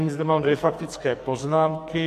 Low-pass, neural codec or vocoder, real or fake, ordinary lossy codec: 14.4 kHz; codec, 44.1 kHz, 2.6 kbps, SNAC; fake; AAC, 64 kbps